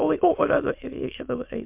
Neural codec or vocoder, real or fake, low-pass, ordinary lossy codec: autoencoder, 22.05 kHz, a latent of 192 numbers a frame, VITS, trained on many speakers; fake; 3.6 kHz; MP3, 32 kbps